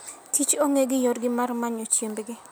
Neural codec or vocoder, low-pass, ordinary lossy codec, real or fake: none; none; none; real